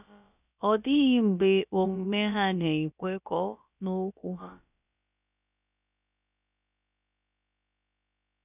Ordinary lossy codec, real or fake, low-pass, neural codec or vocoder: none; fake; 3.6 kHz; codec, 16 kHz, about 1 kbps, DyCAST, with the encoder's durations